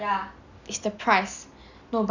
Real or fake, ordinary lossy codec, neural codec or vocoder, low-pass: real; none; none; 7.2 kHz